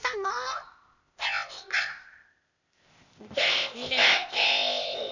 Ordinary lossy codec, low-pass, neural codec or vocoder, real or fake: none; 7.2 kHz; codec, 16 kHz, 0.8 kbps, ZipCodec; fake